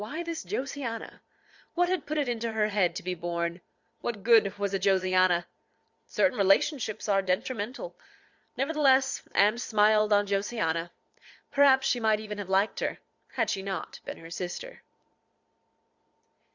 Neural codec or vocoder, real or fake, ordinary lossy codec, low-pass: vocoder, 22.05 kHz, 80 mel bands, Vocos; fake; Opus, 64 kbps; 7.2 kHz